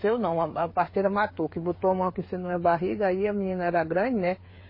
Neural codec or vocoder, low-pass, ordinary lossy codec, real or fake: codec, 16 kHz, 8 kbps, FreqCodec, smaller model; 5.4 kHz; MP3, 24 kbps; fake